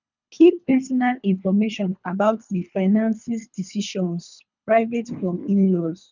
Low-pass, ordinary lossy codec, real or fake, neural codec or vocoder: 7.2 kHz; none; fake; codec, 24 kHz, 3 kbps, HILCodec